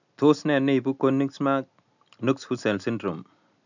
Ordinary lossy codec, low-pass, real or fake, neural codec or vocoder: none; 7.2 kHz; real; none